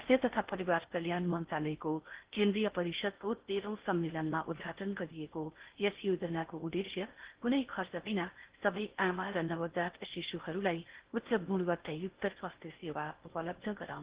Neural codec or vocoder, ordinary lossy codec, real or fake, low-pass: codec, 16 kHz in and 24 kHz out, 0.6 kbps, FocalCodec, streaming, 4096 codes; Opus, 16 kbps; fake; 3.6 kHz